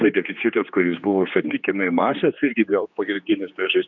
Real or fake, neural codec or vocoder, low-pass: fake; codec, 16 kHz, 2 kbps, X-Codec, HuBERT features, trained on general audio; 7.2 kHz